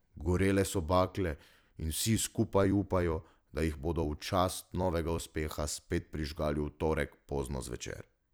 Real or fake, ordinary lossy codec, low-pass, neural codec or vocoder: fake; none; none; vocoder, 44.1 kHz, 128 mel bands every 256 samples, BigVGAN v2